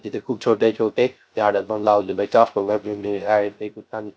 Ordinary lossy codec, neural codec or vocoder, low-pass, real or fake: none; codec, 16 kHz, 0.3 kbps, FocalCodec; none; fake